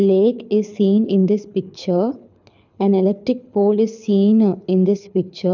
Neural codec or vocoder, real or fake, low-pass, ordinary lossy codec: codec, 24 kHz, 6 kbps, HILCodec; fake; 7.2 kHz; none